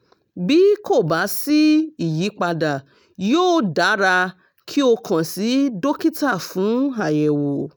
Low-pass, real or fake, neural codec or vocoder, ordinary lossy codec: none; real; none; none